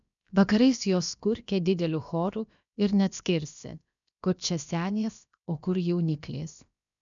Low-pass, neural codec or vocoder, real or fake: 7.2 kHz; codec, 16 kHz, about 1 kbps, DyCAST, with the encoder's durations; fake